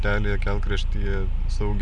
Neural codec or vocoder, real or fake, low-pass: none; real; 9.9 kHz